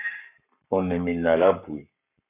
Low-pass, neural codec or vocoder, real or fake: 3.6 kHz; codec, 44.1 kHz, 7.8 kbps, Pupu-Codec; fake